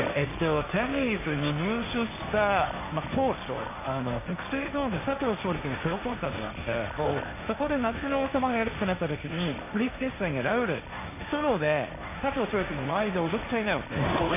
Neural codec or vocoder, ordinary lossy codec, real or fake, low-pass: codec, 16 kHz, 1.1 kbps, Voila-Tokenizer; none; fake; 3.6 kHz